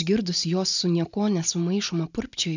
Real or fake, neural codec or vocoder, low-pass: real; none; 7.2 kHz